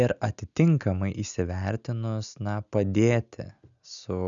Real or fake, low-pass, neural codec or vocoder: real; 7.2 kHz; none